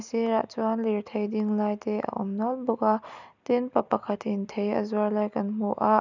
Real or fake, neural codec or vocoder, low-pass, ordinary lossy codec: real; none; 7.2 kHz; none